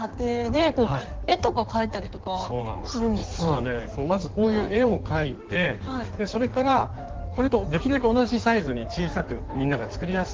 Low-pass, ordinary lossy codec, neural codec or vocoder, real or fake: 7.2 kHz; Opus, 16 kbps; codec, 16 kHz in and 24 kHz out, 1.1 kbps, FireRedTTS-2 codec; fake